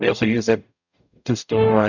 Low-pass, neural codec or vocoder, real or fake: 7.2 kHz; codec, 44.1 kHz, 0.9 kbps, DAC; fake